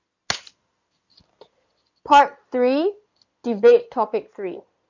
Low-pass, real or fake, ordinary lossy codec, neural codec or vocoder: 7.2 kHz; fake; none; codec, 16 kHz in and 24 kHz out, 2.2 kbps, FireRedTTS-2 codec